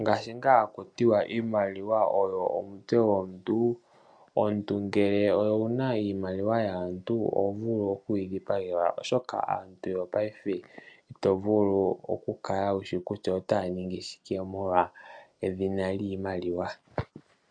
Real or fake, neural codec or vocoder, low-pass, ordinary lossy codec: real; none; 9.9 kHz; MP3, 96 kbps